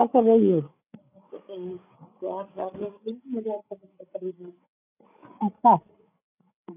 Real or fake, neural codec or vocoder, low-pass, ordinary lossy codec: fake; codec, 16 kHz, 8 kbps, FreqCodec, larger model; 3.6 kHz; none